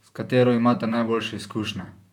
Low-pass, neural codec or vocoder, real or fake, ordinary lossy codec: 19.8 kHz; vocoder, 44.1 kHz, 128 mel bands, Pupu-Vocoder; fake; none